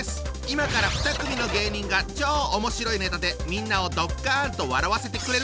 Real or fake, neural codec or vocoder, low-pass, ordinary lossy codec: real; none; none; none